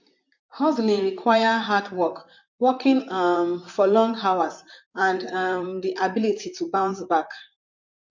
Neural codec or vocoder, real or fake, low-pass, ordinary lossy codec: vocoder, 44.1 kHz, 128 mel bands, Pupu-Vocoder; fake; 7.2 kHz; MP3, 48 kbps